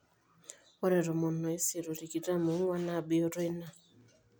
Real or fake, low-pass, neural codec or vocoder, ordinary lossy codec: real; none; none; none